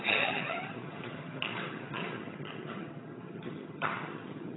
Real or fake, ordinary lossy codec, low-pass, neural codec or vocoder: fake; AAC, 16 kbps; 7.2 kHz; vocoder, 22.05 kHz, 80 mel bands, HiFi-GAN